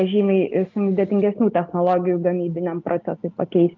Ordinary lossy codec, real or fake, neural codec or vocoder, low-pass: Opus, 32 kbps; real; none; 7.2 kHz